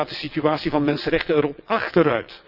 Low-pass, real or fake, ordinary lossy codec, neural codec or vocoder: 5.4 kHz; fake; none; vocoder, 22.05 kHz, 80 mel bands, WaveNeXt